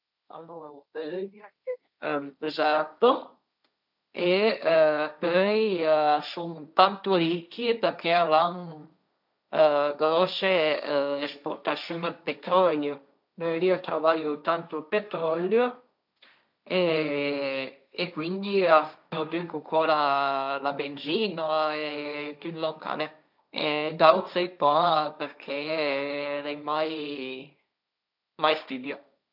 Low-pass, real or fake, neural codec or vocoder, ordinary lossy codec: 5.4 kHz; fake; codec, 16 kHz, 1.1 kbps, Voila-Tokenizer; none